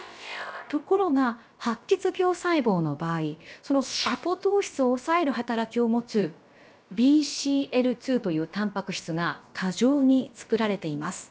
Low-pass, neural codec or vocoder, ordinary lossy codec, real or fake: none; codec, 16 kHz, about 1 kbps, DyCAST, with the encoder's durations; none; fake